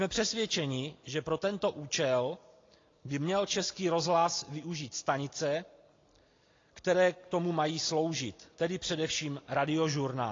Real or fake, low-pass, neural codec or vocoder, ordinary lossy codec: real; 7.2 kHz; none; AAC, 32 kbps